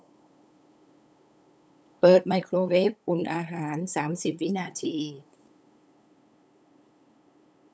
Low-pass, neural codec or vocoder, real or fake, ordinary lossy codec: none; codec, 16 kHz, 8 kbps, FunCodec, trained on LibriTTS, 25 frames a second; fake; none